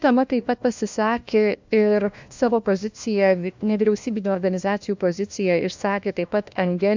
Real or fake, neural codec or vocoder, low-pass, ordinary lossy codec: fake; codec, 16 kHz, 1 kbps, FunCodec, trained on LibriTTS, 50 frames a second; 7.2 kHz; MP3, 64 kbps